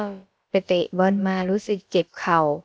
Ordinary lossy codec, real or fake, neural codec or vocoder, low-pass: none; fake; codec, 16 kHz, about 1 kbps, DyCAST, with the encoder's durations; none